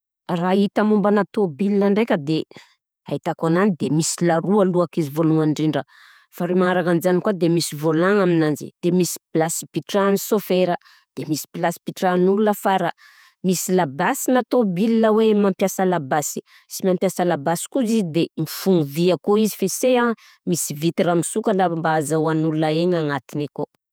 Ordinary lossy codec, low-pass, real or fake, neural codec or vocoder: none; none; fake; vocoder, 48 kHz, 128 mel bands, Vocos